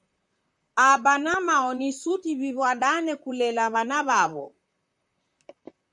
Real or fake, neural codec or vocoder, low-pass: fake; vocoder, 44.1 kHz, 128 mel bands, Pupu-Vocoder; 10.8 kHz